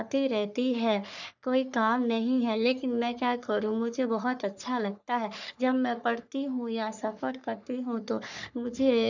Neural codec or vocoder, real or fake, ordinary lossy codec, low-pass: codec, 44.1 kHz, 3.4 kbps, Pupu-Codec; fake; none; 7.2 kHz